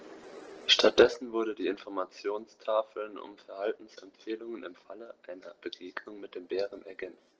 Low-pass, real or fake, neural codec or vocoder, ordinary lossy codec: 7.2 kHz; real; none; Opus, 16 kbps